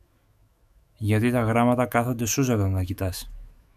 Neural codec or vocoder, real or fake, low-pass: autoencoder, 48 kHz, 128 numbers a frame, DAC-VAE, trained on Japanese speech; fake; 14.4 kHz